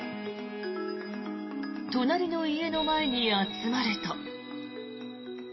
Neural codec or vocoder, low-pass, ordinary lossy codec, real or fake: none; 7.2 kHz; MP3, 24 kbps; real